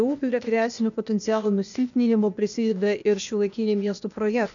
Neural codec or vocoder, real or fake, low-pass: codec, 16 kHz, 0.8 kbps, ZipCodec; fake; 7.2 kHz